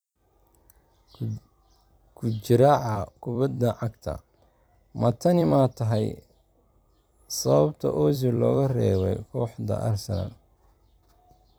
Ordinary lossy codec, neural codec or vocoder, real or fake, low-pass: none; vocoder, 44.1 kHz, 128 mel bands every 256 samples, BigVGAN v2; fake; none